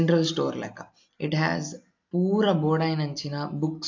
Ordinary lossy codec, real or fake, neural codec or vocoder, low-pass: AAC, 48 kbps; real; none; 7.2 kHz